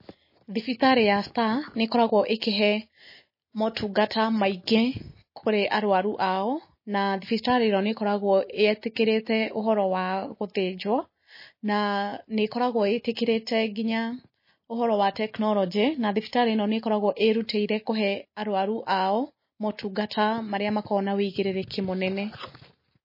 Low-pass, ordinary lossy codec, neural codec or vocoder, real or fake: 5.4 kHz; MP3, 24 kbps; none; real